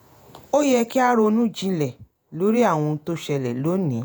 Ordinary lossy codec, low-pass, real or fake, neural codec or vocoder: none; none; fake; vocoder, 48 kHz, 128 mel bands, Vocos